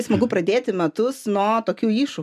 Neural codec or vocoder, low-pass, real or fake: none; 14.4 kHz; real